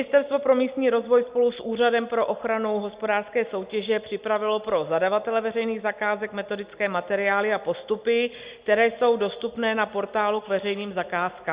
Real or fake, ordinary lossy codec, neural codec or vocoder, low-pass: real; Opus, 64 kbps; none; 3.6 kHz